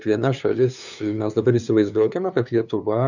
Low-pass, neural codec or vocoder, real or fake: 7.2 kHz; codec, 16 kHz, 2 kbps, FunCodec, trained on LibriTTS, 25 frames a second; fake